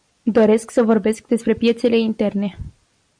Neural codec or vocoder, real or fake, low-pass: none; real; 9.9 kHz